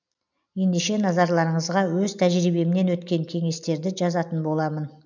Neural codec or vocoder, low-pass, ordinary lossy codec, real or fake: none; 7.2 kHz; none; real